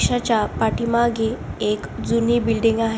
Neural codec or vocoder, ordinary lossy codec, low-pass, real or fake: none; none; none; real